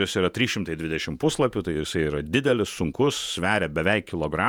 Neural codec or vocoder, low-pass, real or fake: vocoder, 48 kHz, 128 mel bands, Vocos; 19.8 kHz; fake